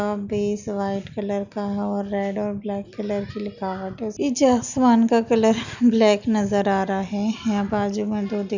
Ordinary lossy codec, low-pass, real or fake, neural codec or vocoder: none; 7.2 kHz; real; none